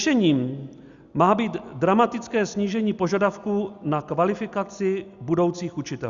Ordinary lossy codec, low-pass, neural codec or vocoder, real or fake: Opus, 64 kbps; 7.2 kHz; none; real